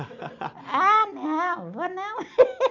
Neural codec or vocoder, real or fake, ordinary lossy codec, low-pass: none; real; none; 7.2 kHz